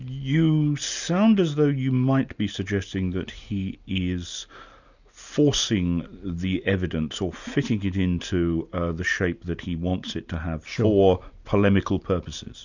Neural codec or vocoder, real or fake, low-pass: none; real; 7.2 kHz